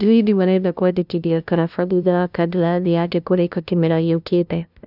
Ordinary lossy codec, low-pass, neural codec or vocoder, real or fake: none; 5.4 kHz; codec, 16 kHz, 0.5 kbps, FunCodec, trained on LibriTTS, 25 frames a second; fake